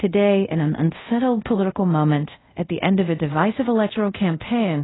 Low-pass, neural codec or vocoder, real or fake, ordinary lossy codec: 7.2 kHz; codec, 24 kHz, 0.5 kbps, DualCodec; fake; AAC, 16 kbps